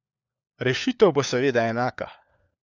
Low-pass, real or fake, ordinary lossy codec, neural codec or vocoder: 7.2 kHz; fake; none; codec, 16 kHz, 4 kbps, FunCodec, trained on LibriTTS, 50 frames a second